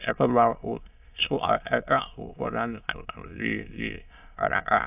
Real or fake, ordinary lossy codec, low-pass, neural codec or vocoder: fake; AAC, 32 kbps; 3.6 kHz; autoencoder, 22.05 kHz, a latent of 192 numbers a frame, VITS, trained on many speakers